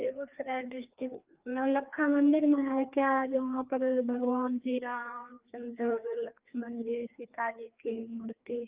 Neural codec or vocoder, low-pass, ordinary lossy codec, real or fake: codec, 16 kHz, 2 kbps, FreqCodec, larger model; 3.6 kHz; Opus, 24 kbps; fake